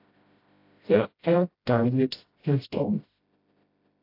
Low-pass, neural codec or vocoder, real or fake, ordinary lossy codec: 5.4 kHz; codec, 16 kHz, 0.5 kbps, FreqCodec, smaller model; fake; AAC, 32 kbps